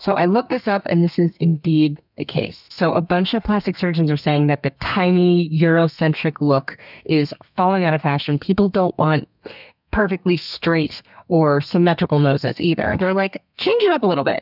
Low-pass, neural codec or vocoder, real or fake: 5.4 kHz; codec, 32 kHz, 1.9 kbps, SNAC; fake